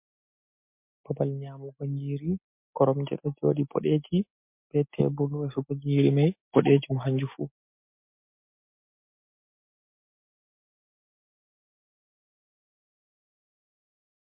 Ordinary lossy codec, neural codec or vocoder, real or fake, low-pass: MP3, 32 kbps; none; real; 3.6 kHz